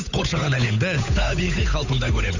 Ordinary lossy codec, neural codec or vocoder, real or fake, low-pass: none; codec, 16 kHz, 8 kbps, FunCodec, trained on Chinese and English, 25 frames a second; fake; 7.2 kHz